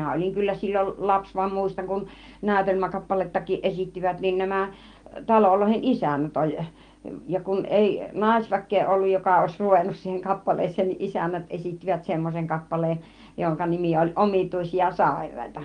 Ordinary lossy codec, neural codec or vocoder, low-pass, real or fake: Opus, 24 kbps; none; 9.9 kHz; real